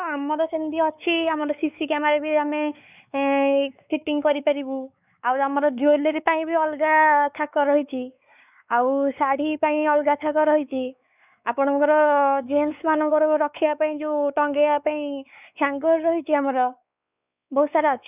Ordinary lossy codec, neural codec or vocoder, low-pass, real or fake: none; codec, 16 kHz, 4 kbps, X-Codec, WavLM features, trained on Multilingual LibriSpeech; 3.6 kHz; fake